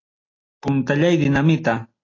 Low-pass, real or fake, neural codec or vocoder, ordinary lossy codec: 7.2 kHz; real; none; AAC, 32 kbps